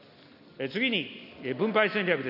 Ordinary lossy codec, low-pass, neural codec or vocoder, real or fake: none; 5.4 kHz; none; real